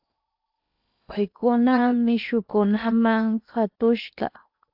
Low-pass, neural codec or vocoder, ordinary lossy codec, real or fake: 5.4 kHz; codec, 16 kHz in and 24 kHz out, 0.8 kbps, FocalCodec, streaming, 65536 codes; AAC, 48 kbps; fake